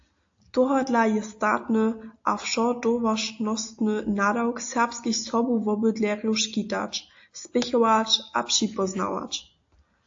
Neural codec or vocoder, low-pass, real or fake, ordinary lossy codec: none; 7.2 kHz; real; AAC, 48 kbps